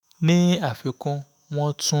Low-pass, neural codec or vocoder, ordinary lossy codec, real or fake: none; autoencoder, 48 kHz, 128 numbers a frame, DAC-VAE, trained on Japanese speech; none; fake